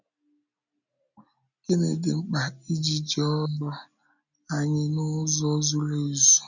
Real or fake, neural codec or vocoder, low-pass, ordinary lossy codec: real; none; 7.2 kHz; none